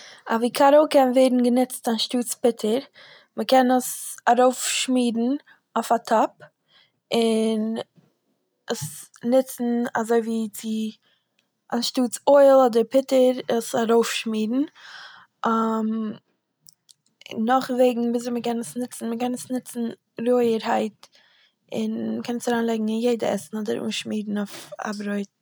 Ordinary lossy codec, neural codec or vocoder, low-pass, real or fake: none; none; none; real